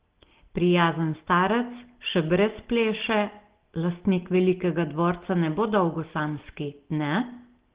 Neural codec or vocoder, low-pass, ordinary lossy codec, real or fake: none; 3.6 kHz; Opus, 16 kbps; real